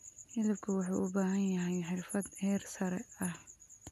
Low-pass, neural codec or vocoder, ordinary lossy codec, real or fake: 14.4 kHz; none; none; real